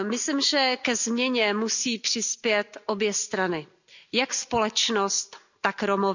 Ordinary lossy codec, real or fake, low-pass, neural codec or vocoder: none; real; 7.2 kHz; none